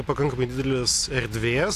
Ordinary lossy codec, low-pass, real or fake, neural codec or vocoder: AAC, 64 kbps; 14.4 kHz; real; none